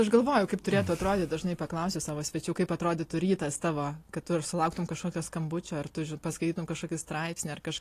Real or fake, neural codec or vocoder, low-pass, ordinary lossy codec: real; none; 14.4 kHz; AAC, 48 kbps